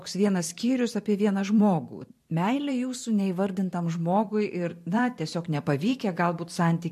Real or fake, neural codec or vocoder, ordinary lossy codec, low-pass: fake; vocoder, 44.1 kHz, 128 mel bands every 512 samples, BigVGAN v2; MP3, 64 kbps; 14.4 kHz